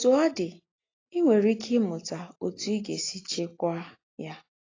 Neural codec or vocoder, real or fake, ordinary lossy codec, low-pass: none; real; AAC, 32 kbps; 7.2 kHz